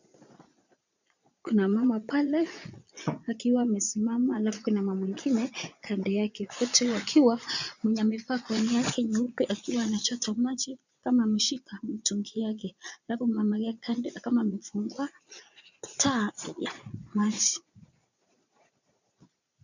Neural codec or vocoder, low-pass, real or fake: vocoder, 22.05 kHz, 80 mel bands, Vocos; 7.2 kHz; fake